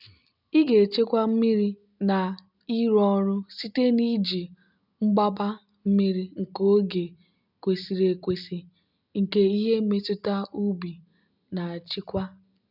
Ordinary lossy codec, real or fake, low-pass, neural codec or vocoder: none; real; 5.4 kHz; none